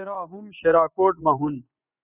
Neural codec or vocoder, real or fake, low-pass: vocoder, 24 kHz, 100 mel bands, Vocos; fake; 3.6 kHz